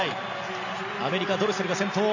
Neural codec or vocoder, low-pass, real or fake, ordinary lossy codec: none; 7.2 kHz; real; none